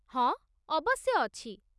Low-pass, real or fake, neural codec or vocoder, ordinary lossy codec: none; real; none; none